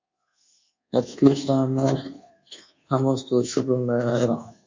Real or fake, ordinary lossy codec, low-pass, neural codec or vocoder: fake; MP3, 48 kbps; 7.2 kHz; codec, 24 kHz, 1.2 kbps, DualCodec